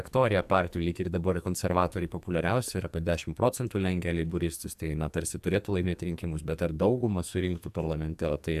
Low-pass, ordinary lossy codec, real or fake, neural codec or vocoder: 14.4 kHz; AAC, 96 kbps; fake; codec, 44.1 kHz, 2.6 kbps, SNAC